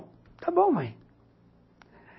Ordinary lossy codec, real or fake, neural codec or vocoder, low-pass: MP3, 24 kbps; real; none; 7.2 kHz